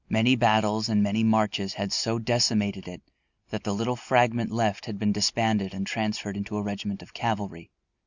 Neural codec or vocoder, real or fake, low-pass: none; real; 7.2 kHz